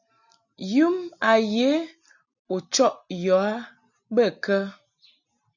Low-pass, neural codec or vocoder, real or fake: 7.2 kHz; none; real